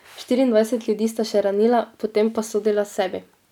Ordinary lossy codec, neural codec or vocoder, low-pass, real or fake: none; none; 19.8 kHz; real